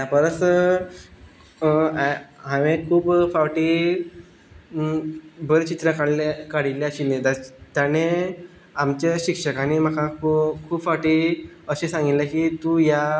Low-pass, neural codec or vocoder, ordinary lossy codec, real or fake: none; none; none; real